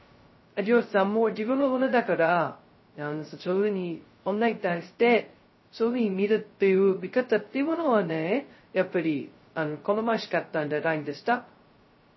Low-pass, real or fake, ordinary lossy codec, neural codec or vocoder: 7.2 kHz; fake; MP3, 24 kbps; codec, 16 kHz, 0.2 kbps, FocalCodec